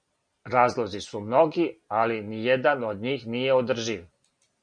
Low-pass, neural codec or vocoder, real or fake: 9.9 kHz; none; real